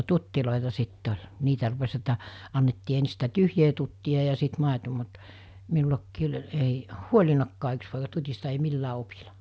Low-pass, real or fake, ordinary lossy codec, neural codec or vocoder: none; real; none; none